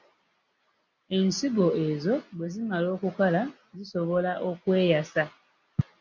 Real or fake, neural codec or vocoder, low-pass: real; none; 7.2 kHz